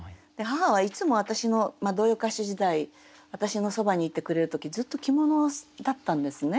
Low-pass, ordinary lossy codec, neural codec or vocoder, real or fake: none; none; none; real